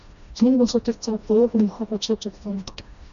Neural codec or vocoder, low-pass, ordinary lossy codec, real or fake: codec, 16 kHz, 1 kbps, FreqCodec, smaller model; 7.2 kHz; MP3, 96 kbps; fake